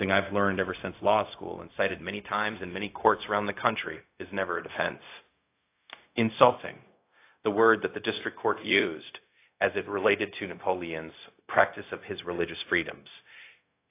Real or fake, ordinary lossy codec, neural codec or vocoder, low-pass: fake; AAC, 24 kbps; codec, 16 kHz, 0.4 kbps, LongCat-Audio-Codec; 3.6 kHz